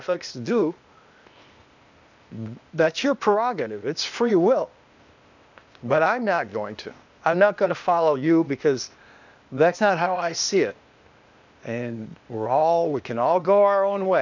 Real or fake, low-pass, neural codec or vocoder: fake; 7.2 kHz; codec, 16 kHz, 0.8 kbps, ZipCodec